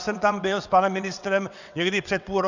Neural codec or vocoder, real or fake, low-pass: vocoder, 22.05 kHz, 80 mel bands, WaveNeXt; fake; 7.2 kHz